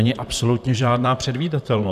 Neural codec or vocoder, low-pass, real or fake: vocoder, 44.1 kHz, 128 mel bands, Pupu-Vocoder; 14.4 kHz; fake